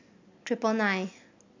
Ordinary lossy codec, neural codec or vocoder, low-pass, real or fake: MP3, 64 kbps; none; 7.2 kHz; real